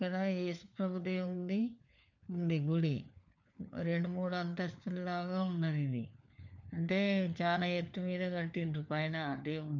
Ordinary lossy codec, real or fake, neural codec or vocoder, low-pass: none; fake; codec, 16 kHz, 4 kbps, FunCodec, trained on LibriTTS, 50 frames a second; 7.2 kHz